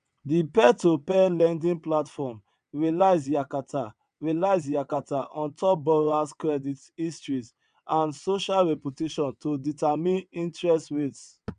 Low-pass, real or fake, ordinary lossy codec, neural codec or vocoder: 9.9 kHz; fake; none; vocoder, 22.05 kHz, 80 mel bands, WaveNeXt